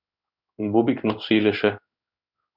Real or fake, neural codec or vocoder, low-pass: fake; codec, 16 kHz in and 24 kHz out, 1 kbps, XY-Tokenizer; 5.4 kHz